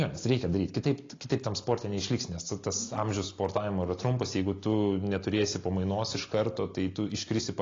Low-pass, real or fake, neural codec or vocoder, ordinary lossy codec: 7.2 kHz; real; none; AAC, 32 kbps